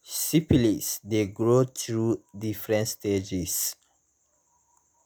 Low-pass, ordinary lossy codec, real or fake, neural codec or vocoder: none; none; real; none